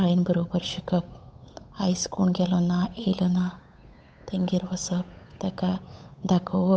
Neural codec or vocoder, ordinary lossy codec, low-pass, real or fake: codec, 16 kHz, 8 kbps, FunCodec, trained on Chinese and English, 25 frames a second; none; none; fake